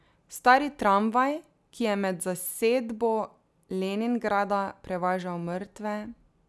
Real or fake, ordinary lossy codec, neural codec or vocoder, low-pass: real; none; none; none